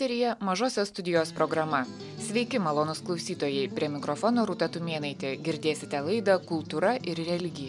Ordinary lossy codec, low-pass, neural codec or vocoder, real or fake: MP3, 96 kbps; 10.8 kHz; none; real